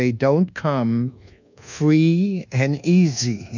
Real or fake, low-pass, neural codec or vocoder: fake; 7.2 kHz; codec, 24 kHz, 1.2 kbps, DualCodec